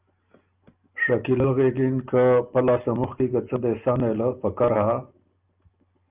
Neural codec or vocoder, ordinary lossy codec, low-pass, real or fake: none; Opus, 24 kbps; 3.6 kHz; real